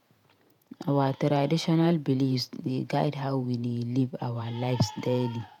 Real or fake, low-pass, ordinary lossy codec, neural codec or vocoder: fake; 19.8 kHz; none; vocoder, 48 kHz, 128 mel bands, Vocos